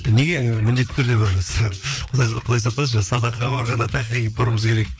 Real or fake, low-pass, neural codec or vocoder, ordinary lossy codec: fake; none; codec, 16 kHz, 4 kbps, FreqCodec, larger model; none